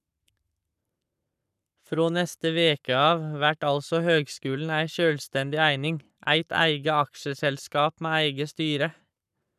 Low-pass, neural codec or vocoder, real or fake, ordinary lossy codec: 14.4 kHz; codec, 44.1 kHz, 7.8 kbps, Pupu-Codec; fake; none